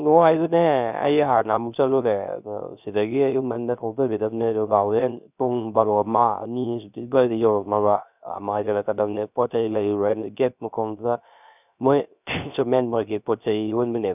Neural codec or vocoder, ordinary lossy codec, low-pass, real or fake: codec, 16 kHz, 0.3 kbps, FocalCodec; none; 3.6 kHz; fake